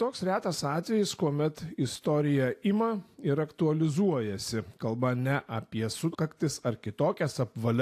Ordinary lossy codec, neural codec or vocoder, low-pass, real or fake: AAC, 64 kbps; none; 14.4 kHz; real